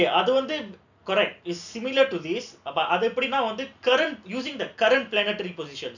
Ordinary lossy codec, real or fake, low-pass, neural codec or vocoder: none; real; 7.2 kHz; none